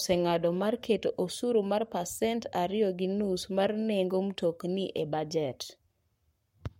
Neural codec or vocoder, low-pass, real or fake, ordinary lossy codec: codec, 44.1 kHz, 7.8 kbps, Pupu-Codec; 19.8 kHz; fake; MP3, 64 kbps